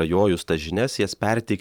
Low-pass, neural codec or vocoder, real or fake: 19.8 kHz; vocoder, 44.1 kHz, 128 mel bands every 512 samples, BigVGAN v2; fake